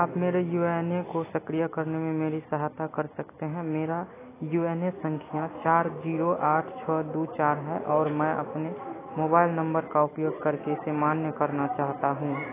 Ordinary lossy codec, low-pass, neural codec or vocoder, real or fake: AAC, 16 kbps; 3.6 kHz; none; real